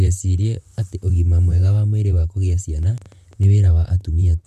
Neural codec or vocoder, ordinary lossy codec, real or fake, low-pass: vocoder, 44.1 kHz, 128 mel bands, Pupu-Vocoder; none; fake; 14.4 kHz